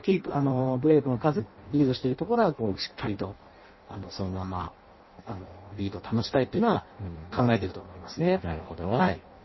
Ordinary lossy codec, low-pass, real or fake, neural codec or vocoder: MP3, 24 kbps; 7.2 kHz; fake; codec, 16 kHz in and 24 kHz out, 0.6 kbps, FireRedTTS-2 codec